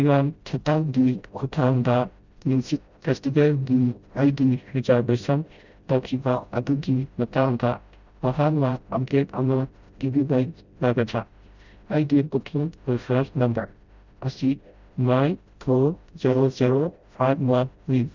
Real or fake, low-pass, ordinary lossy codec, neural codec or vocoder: fake; 7.2 kHz; Opus, 64 kbps; codec, 16 kHz, 0.5 kbps, FreqCodec, smaller model